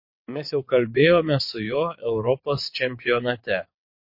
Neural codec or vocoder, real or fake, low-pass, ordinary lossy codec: vocoder, 22.05 kHz, 80 mel bands, WaveNeXt; fake; 5.4 kHz; MP3, 32 kbps